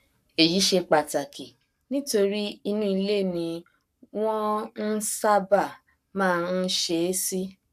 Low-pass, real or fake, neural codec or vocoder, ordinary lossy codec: 14.4 kHz; fake; codec, 44.1 kHz, 7.8 kbps, Pupu-Codec; none